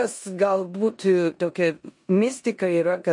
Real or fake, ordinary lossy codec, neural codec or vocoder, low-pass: fake; MP3, 48 kbps; codec, 16 kHz in and 24 kHz out, 0.9 kbps, LongCat-Audio-Codec, four codebook decoder; 10.8 kHz